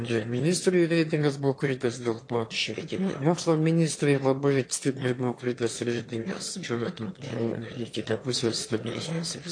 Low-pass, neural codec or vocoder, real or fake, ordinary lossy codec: 9.9 kHz; autoencoder, 22.05 kHz, a latent of 192 numbers a frame, VITS, trained on one speaker; fake; AAC, 48 kbps